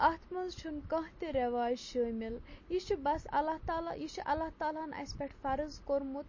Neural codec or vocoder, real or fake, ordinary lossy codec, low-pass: none; real; MP3, 32 kbps; 7.2 kHz